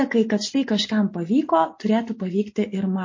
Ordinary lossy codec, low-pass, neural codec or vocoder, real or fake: MP3, 32 kbps; 7.2 kHz; none; real